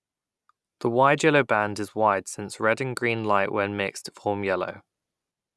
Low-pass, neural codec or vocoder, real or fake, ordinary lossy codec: none; none; real; none